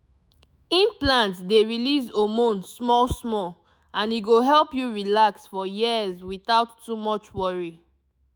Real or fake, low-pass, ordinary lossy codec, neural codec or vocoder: fake; none; none; autoencoder, 48 kHz, 128 numbers a frame, DAC-VAE, trained on Japanese speech